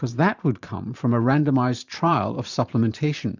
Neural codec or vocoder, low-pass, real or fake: vocoder, 44.1 kHz, 128 mel bands every 512 samples, BigVGAN v2; 7.2 kHz; fake